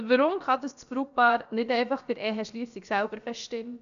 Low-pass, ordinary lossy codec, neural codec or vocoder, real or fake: 7.2 kHz; none; codec, 16 kHz, about 1 kbps, DyCAST, with the encoder's durations; fake